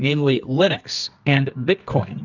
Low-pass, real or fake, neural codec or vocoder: 7.2 kHz; fake; codec, 24 kHz, 0.9 kbps, WavTokenizer, medium music audio release